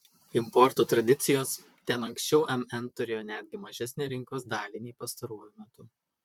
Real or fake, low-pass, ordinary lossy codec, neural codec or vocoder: fake; 19.8 kHz; MP3, 96 kbps; vocoder, 44.1 kHz, 128 mel bands, Pupu-Vocoder